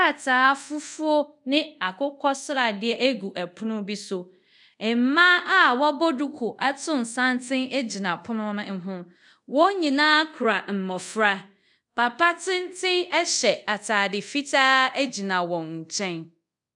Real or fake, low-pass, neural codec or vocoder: fake; 10.8 kHz; codec, 24 kHz, 0.5 kbps, DualCodec